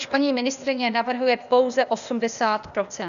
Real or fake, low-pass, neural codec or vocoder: fake; 7.2 kHz; codec, 16 kHz, 0.8 kbps, ZipCodec